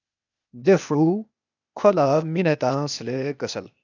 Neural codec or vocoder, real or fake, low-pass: codec, 16 kHz, 0.8 kbps, ZipCodec; fake; 7.2 kHz